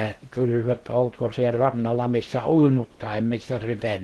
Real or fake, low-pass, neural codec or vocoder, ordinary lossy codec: fake; 10.8 kHz; codec, 16 kHz in and 24 kHz out, 0.8 kbps, FocalCodec, streaming, 65536 codes; Opus, 16 kbps